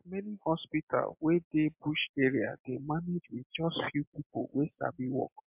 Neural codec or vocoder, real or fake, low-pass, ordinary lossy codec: none; real; 3.6 kHz; MP3, 32 kbps